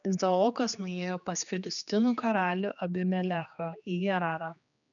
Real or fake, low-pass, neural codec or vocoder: fake; 7.2 kHz; codec, 16 kHz, 2 kbps, X-Codec, HuBERT features, trained on general audio